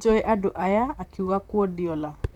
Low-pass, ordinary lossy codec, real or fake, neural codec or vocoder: 19.8 kHz; none; fake; vocoder, 44.1 kHz, 128 mel bands, Pupu-Vocoder